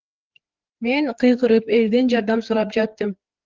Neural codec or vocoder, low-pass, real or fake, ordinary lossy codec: codec, 16 kHz, 4 kbps, FreqCodec, larger model; 7.2 kHz; fake; Opus, 16 kbps